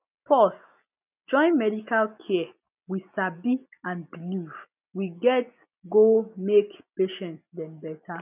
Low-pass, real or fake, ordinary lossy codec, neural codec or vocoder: 3.6 kHz; real; none; none